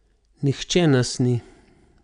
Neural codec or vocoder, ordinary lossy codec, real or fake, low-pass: none; none; real; 9.9 kHz